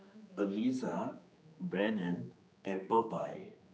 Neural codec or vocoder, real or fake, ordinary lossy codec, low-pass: codec, 16 kHz, 2 kbps, X-Codec, HuBERT features, trained on general audio; fake; none; none